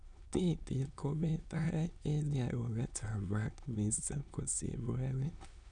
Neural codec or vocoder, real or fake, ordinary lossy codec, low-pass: autoencoder, 22.05 kHz, a latent of 192 numbers a frame, VITS, trained on many speakers; fake; none; 9.9 kHz